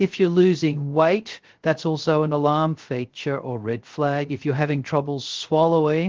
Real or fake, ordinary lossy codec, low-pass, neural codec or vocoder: fake; Opus, 16 kbps; 7.2 kHz; codec, 16 kHz, 0.3 kbps, FocalCodec